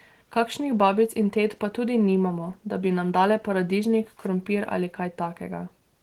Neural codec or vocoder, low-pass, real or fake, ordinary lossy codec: none; 19.8 kHz; real; Opus, 16 kbps